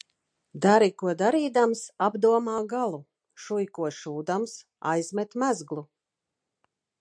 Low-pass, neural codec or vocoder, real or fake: 9.9 kHz; none; real